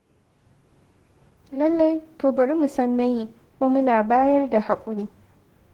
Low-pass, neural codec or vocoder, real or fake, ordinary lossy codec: 19.8 kHz; codec, 44.1 kHz, 2.6 kbps, DAC; fake; Opus, 16 kbps